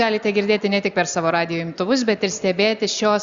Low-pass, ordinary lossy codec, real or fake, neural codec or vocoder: 7.2 kHz; Opus, 64 kbps; real; none